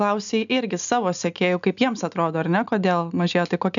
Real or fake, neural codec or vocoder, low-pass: real; none; 7.2 kHz